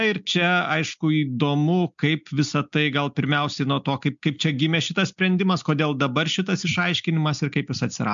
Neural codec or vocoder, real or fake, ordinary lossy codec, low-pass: none; real; MP3, 64 kbps; 7.2 kHz